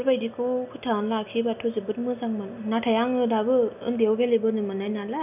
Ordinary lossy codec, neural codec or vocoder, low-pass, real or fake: none; none; 3.6 kHz; real